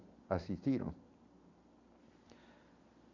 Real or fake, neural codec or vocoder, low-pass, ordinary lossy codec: real; none; 7.2 kHz; AAC, 48 kbps